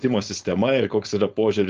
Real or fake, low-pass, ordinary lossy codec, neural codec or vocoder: fake; 7.2 kHz; Opus, 24 kbps; codec, 16 kHz, 4.8 kbps, FACodec